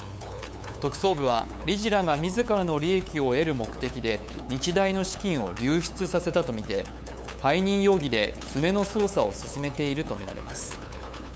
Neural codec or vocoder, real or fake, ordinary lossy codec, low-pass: codec, 16 kHz, 8 kbps, FunCodec, trained on LibriTTS, 25 frames a second; fake; none; none